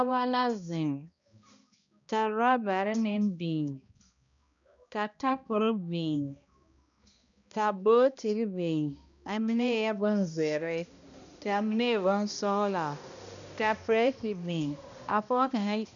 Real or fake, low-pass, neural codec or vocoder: fake; 7.2 kHz; codec, 16 kHz, 1 kbps, X-Codec, HuBERT features, trained on balanced general audio